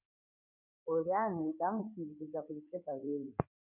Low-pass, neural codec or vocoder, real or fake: 3.6 kHz; codec, 16 kHz in and 24 kHz out, 2.2 kbps, FireRedTTS-2 codec; fake